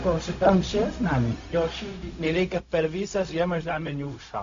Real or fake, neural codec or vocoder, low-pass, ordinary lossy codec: fake; codec, 16 kHz, 0.4 kbps, LongCat-Audio-Codec; 7.2 kHz; AAC, 64 kbps